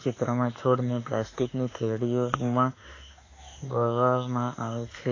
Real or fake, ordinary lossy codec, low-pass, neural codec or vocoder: fake; AAC, 32 kbps; 7.2 kHz; autoencoder, 48 kHz, 32 numbers a frame, DAC-VAE, trained on Japanese speech